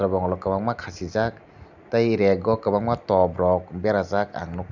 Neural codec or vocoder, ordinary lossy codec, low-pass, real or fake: none; none; 7.2 kHz; real